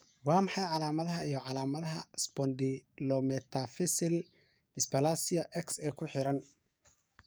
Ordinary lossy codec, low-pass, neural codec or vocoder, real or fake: none; none; codec, 44.1 kHz, 7.8 kbps, DAC; fake